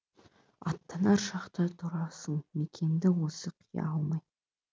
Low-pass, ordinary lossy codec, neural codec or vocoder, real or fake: none; none; none; real